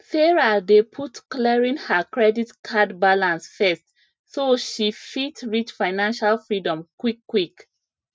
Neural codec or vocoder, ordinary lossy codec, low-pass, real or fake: none; none; none; real